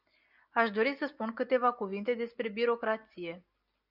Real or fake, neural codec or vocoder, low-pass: real; none; 5.4 kHz